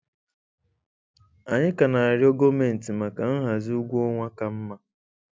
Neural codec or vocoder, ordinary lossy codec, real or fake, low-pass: none; none; real; none